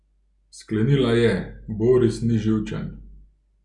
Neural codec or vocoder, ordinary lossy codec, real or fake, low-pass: none; none; real; 10.8 kHz